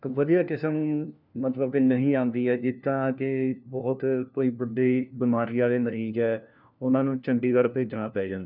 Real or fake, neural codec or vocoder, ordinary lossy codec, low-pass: fake; codec, 16 kHz, 1 kbps, FunCodec, trained on LibriTTS, 50 frames a second; none; 5.4 kHz